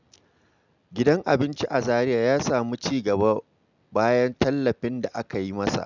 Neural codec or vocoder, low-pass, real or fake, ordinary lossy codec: none; 7.2 kHz; real; none